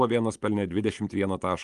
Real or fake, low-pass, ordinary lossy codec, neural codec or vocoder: fake; 10.8 kHz; Opus, 32 kbps; vocoder, 24 kHz, 100 mel bands, Vocos